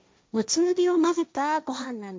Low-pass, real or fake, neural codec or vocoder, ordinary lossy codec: none; fake; codec, 16 kHz, 1.1 kbps, Voila-Tokenizer; none